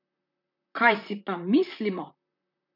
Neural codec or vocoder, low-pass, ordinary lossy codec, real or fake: vocoder, 44.1 kHz, 128 mel bands, Pupu-Vocoder; 5.4 kHz; MP3, 48 kbps; fake